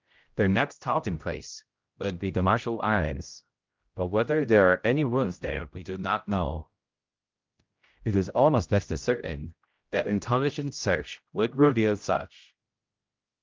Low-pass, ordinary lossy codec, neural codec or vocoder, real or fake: 7.2 kHz; Opus, 24 kbps; codec, 16 kHz, 0.5 kbps, X-Codec, HuBERT features, trained on general audio; fake